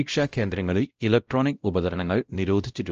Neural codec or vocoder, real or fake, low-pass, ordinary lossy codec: codec, 16 kHz, 0.5 kbps, X-Codec, WavLM features, trained on Multilingual LibriSpeech; fake; 7.2 kHz; Opus, 24 kbps